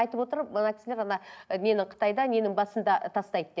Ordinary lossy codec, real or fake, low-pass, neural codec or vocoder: none; real; none; none